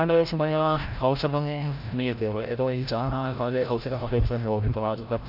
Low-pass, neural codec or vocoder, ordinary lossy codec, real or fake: 5.4 kHz; codec, 16 kHz, 0.5 kbps, FreqCodec, larger model; AAC, 48 kbps; fake